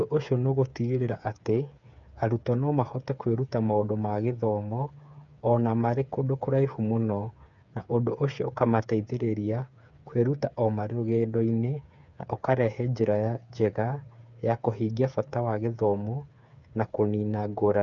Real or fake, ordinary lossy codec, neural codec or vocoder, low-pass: fake; none; codec, 16 kHz, 8 kbps, FreqCodec, smaller model; 7.2 kHz